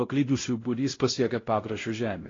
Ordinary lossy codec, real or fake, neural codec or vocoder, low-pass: AAC, 32 kbps; fake; codec, 16 kHz, 0.5 kbps, X-Codec, WavLM features, trained on Multilingual LibriSpeech; 7.2 kHz